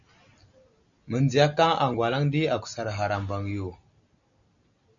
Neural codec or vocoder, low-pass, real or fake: none; 7.2 kHz; real